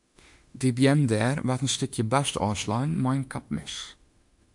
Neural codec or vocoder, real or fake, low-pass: autoencoder, 48 kHz, 32 numbers a frame, DAC-VAE, trained on Japanese speech; fake; 10.8 kHz